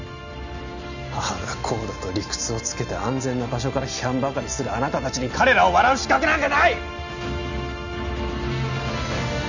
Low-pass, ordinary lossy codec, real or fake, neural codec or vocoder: 7.2 kHz; none; real; none